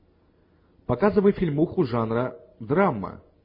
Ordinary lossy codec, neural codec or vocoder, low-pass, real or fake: MP3, 24 kbps; none; 5.4 kHz; real